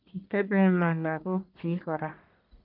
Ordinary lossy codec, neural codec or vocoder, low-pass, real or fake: none; codec, 44.1 kHz, 1.7 kbps, Pupu-Codec; 5.4 kHz; fake